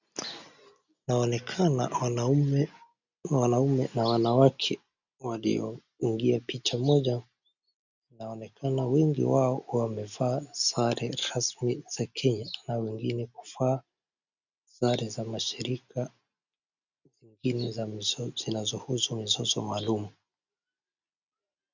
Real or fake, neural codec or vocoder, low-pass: real; none; 7.2 kHz